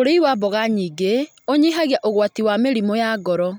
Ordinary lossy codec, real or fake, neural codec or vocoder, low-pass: none; real; none; none